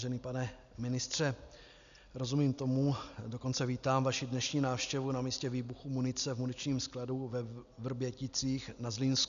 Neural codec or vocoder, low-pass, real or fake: none; 7.2 kHz; real